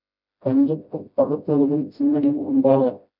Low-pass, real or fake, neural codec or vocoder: 5.4 kHz; fake; codec, 16 kHz, 0.5 kbps, FreqCodec, smaller model